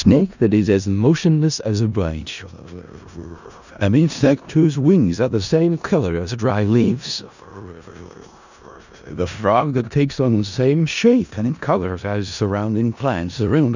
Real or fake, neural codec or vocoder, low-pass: fake; codec, 16 kHz in and 24 kHz out, 0.4 kbps, LongCat-Audio-Codec, four codebook decoder; 7.2 kHz